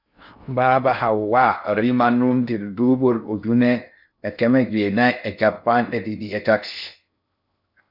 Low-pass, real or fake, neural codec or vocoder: 5.4 kHz; fake; codec, 16 kHz in and 24 kHz out, 0.6 kbps, FocalCodec, streaming, 2048 codes